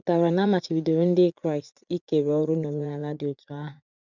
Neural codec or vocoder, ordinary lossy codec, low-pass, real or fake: vocoder, 44.1 kHz, 80 mel bands, Vocos; none; 7.2 kHz; fake